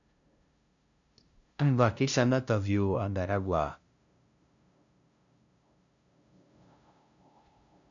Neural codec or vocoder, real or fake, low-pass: codec, 16 kHz, 0.5 kbps, FunCodec, trained on LibriTTS, 25 frames a second; fake; 7.2 kHz